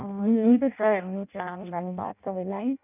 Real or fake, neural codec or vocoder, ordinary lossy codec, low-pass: fake; codec, 16 kHz in and 24 kHz out, 0.6 kbps, FireRedTTS-2 codec; none; 3.6 kHz